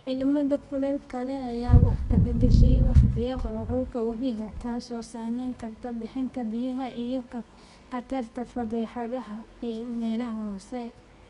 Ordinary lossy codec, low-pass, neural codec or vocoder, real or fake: none; 10.8 kHz; codec, 24 kHz, 0.9 kbps, WavTokenizer, medium music audio release; fake